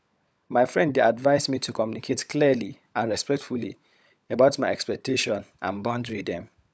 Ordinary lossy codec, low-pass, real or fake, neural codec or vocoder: none; none; fake; codec, 16 kHz, 16 kbps, FunCodec, trained on LibriTTS, 50 frames a second